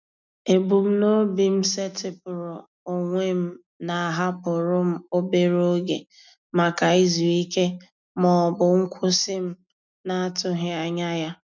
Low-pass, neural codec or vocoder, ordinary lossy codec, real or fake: 7.2 kHz; none; none; real